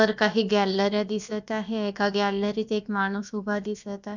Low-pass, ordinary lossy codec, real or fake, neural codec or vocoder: 7.2 kHz; none; fake; codec, 16 kHz, about 1 kbps, DyCAST, with the encoder's durations